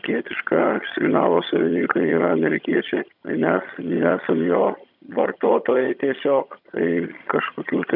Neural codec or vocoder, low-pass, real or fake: vocoder, 22.05 kHz, 80 mel bands, HiFi-GAN; 5.4 kHz; fake